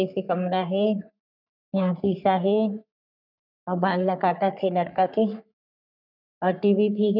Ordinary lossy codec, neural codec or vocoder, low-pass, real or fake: none; codec, 44.1 kHz, 3.4 kbps, Pupu-Codec; 5.4 kHz; fake